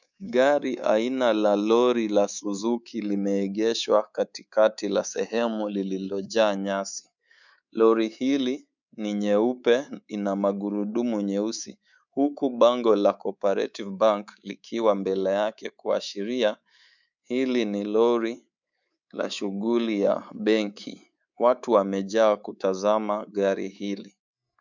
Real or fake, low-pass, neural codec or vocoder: fake; 7.2 kHz; codec, 24 kHz, 3.1 kbps, DualCodec